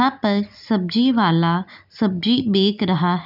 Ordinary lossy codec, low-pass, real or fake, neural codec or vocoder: none; 5.4 kHz; real; none